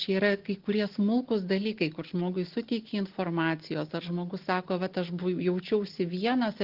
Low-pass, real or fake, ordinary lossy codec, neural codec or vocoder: 5.4 kHz; real; Opus, 16 kbps; none